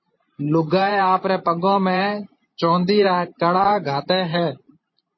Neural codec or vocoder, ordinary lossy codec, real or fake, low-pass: vocoder, 44.1 kHz, 128 mel bands every 512 samples, BigVGAN v2; MP3, 24 kbps; fake; 7.2 kHz